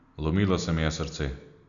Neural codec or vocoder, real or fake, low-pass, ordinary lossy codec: none; real; 7.2 kHz; none